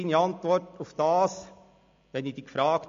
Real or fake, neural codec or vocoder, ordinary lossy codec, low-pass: real; none; MP3, 48 kbps; 7.2 kHz